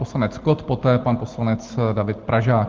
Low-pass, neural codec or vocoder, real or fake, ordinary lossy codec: 7.2 kHz; none; real; Opus, 16 kbps